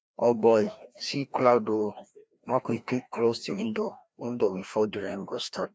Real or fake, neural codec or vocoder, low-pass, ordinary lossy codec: fake; codec, 16 kHz, 1 kbps, FreqCodec, larger model; none; none